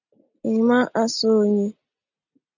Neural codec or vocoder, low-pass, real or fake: none; 7.2 kHz; real